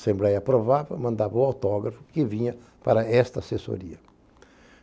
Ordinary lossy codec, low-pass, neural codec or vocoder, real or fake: none; none; none; real